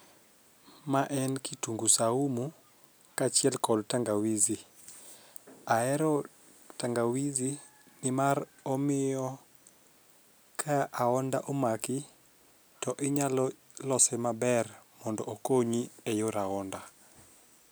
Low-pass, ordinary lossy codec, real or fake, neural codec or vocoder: none; none; real; none